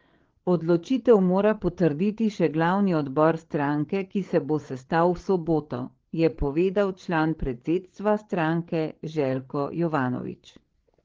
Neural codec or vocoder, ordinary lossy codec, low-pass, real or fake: codec, 16 kHz, 8 kbps, FreqCodec, larger model; Opus, 16 kbps; 7.2 kHz; fake